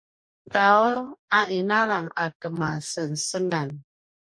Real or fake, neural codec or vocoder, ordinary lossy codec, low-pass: fake; codec, 44.1 kHz, 2.6 kbps, DAC; MP3, 64 kbps; 9.9 kHz